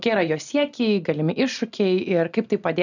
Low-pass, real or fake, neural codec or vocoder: 7.2 kHz; real; none